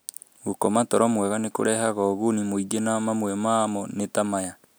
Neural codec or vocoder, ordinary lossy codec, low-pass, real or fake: none; none; none; real